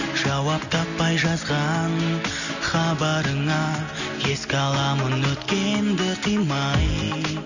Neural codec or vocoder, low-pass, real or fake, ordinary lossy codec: none; 7.2 kHz; real; none